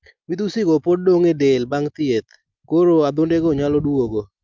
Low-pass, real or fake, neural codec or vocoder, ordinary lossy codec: 7.2 kHz; real; none; Opus, 24 kbps